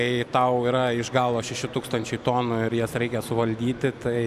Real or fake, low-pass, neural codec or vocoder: real; 14.4 kHz; none